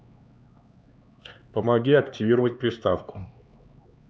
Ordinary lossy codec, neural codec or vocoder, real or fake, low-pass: none; codec, 16 kHz, 4 kbps, X-Codec, HuBERT features, trained on LibriSpeech; fake; none